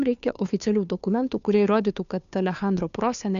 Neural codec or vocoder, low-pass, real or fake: codec, 16 kHz, 2 kbps, FunCodec, trained on Chinese and English, 25 frames a second; 7.2 kHz; fake